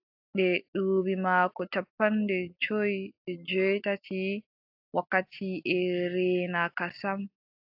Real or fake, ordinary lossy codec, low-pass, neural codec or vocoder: real; AAC, 48 kbps; 5.4 kHz; none